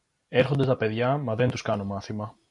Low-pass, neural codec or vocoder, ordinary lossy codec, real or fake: 10.8 kHz; none; MP3, 96 kbps; real